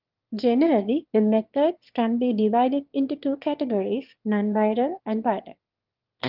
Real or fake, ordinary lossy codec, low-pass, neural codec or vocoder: fake; Opus, 32 kbps; 5.4 kHz; autoencoder, 22.05 kHz, a latent of 192 numbers a frame, VITS, trained on one speaker